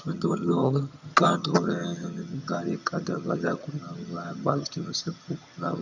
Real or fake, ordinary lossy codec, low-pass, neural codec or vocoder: fake; none; 7.2 kHz; vocoder, 22.05 kHz, 80 mel bands, HiFi-GAN